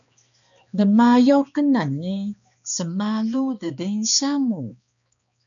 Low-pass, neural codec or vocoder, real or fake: 7.2 kHz; codec, 16 kHz, 2 kbps, X-Codec, HuBERT features, trained on balanced general audio; fake